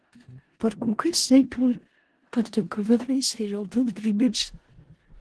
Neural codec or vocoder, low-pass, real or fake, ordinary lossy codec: codec, 16 kHz in and 24 kHz out, 0.4 kbps, LongCat-Audio-Codec, four codebook decoder; 10.8 kHz; fake; Opus, 16 kbps